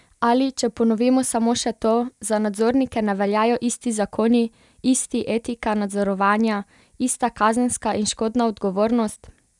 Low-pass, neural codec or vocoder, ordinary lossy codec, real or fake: 10.8 kHz; none; none; real